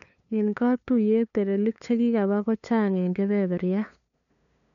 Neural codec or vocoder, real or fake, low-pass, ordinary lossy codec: codec, 16 kHz, 2 kbps, FunCodec, trained on LibriTTS, 25 frames a second; fake; 7.2 kHz; none